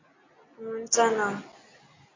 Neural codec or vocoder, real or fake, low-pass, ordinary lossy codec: none; real; 7.2 kHz; AAC, 32 kbps